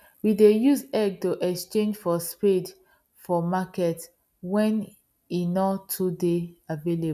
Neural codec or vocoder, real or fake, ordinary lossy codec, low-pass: none; real; none; 14.4 kHz